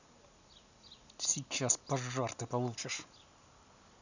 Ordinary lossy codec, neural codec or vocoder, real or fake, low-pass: none; none; real; 7.2 kHz